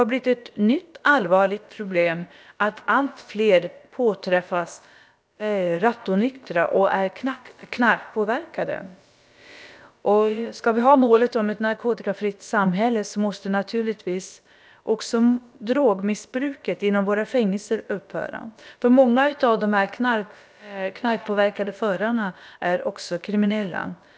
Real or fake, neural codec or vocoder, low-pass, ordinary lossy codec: fake; codec, 16 kHz, about 1 kbps, DyCAST, with the encoder's durations; none; none